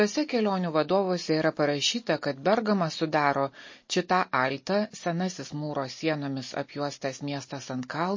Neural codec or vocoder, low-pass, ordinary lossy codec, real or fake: none; 7.2 kHz; MP3, 32 kbps; real